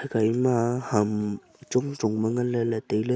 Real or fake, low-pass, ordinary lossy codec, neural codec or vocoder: real; none; none; none